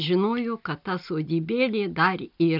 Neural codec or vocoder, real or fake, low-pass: none; real; 5.4 kHz